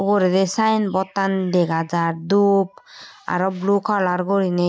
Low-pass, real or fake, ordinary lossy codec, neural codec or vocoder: none; real; none; none